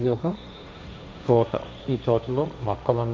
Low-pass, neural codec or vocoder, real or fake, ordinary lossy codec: none; codec, 16 kHz, 1.1 kbps, Voila-Tokenizer; fake; none